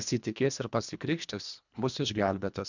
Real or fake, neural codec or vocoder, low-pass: fake; codec, 24 kHz, 1.5 kbps, HILCodec; 7.2 kHz